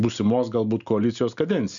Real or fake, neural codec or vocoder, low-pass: real; none; 7.2 kHz